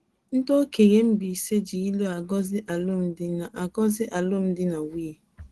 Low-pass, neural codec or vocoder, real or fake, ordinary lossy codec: 10.8 kHz; none; real; Opus, 16 kbps